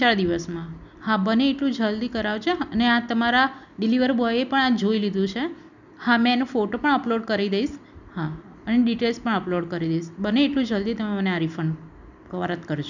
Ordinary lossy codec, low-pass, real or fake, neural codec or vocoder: none; 7.2 kHz; real; none